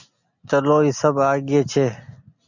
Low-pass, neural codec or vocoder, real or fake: 7.2 kHz; none; real